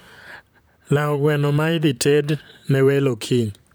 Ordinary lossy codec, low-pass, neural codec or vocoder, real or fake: none; none; vocoder, 44.1 kHz, 128 mel bands, Pupu-Vocoder; fake